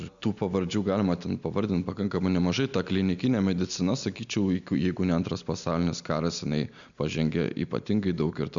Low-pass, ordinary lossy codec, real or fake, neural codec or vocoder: 7.2 kHz; MP3, 96 kbps; real; none